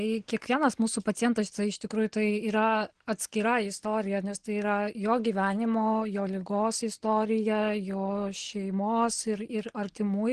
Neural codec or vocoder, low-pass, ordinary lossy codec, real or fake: none; 9.9 kHz; Opus, 16 kbps; real